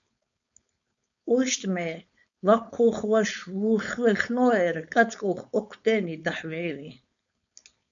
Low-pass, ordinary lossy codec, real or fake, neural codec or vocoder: 7.2 kHz; MP3, 96 kbps; fake; codec, 16 kHz, 4.8 kbps, FACodec